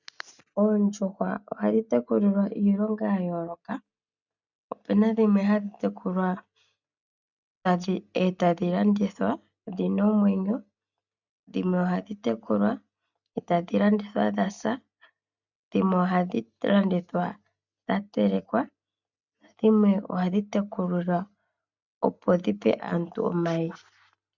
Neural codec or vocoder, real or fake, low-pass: none; real; 7.2 kHz